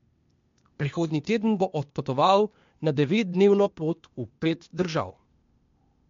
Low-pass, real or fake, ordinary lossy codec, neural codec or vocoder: 7.2 kHz; fake; MP3, 48 kbps; codec, 16 kHz, 0.8 kbps, ZipCodec